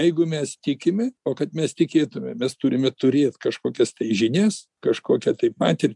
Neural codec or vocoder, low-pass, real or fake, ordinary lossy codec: none; 10.8 kHz; real; MP3, 96 kbps